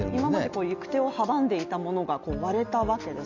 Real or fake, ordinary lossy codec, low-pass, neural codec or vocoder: real; none; 7.2 kHz; none